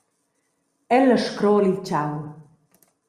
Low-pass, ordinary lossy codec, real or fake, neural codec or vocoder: 14.4 kHz; Opus, 64 kbps; real; none